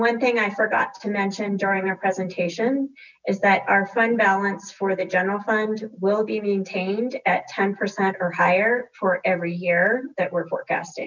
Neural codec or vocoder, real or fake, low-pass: none; real; 7.2 kHz